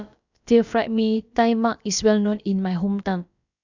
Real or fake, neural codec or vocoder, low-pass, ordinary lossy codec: fake; codec, 16 kHz, about 1 kbps, DyCAST, with the encoder's durations; 7.2 kHz; none